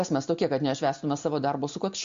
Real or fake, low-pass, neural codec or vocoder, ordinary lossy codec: real; 7.2 kHz; none; MP3, 48 kbps